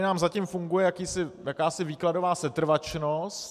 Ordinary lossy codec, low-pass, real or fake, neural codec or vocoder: MP3, 96 kbps; 14.4 kHz; real; none